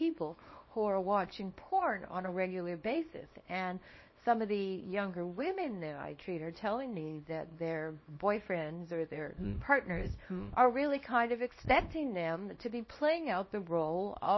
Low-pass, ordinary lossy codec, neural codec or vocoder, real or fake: 7.2 kHz; MP3, 24 kbps; codec, 24 kHz, 0.9 kbps, WavTokenizer, small release; fake